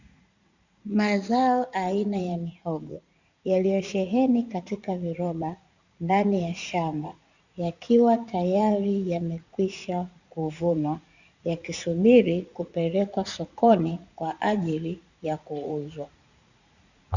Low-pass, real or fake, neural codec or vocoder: 7.2 kHz; fake; vocoder, 44.1 kHz, 80 mel bands, Vocos